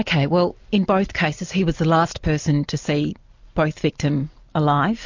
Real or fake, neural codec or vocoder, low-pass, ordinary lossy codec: real; none; 7.2 kHz; MP3, 48 kbps